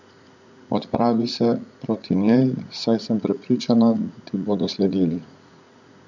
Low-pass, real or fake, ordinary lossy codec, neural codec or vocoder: 7.2 kHz; fake; none; vocoder, 44.1 kHz, 80 mel bands, Vocos